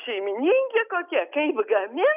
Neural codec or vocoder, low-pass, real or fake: none; 3.6 kHz; real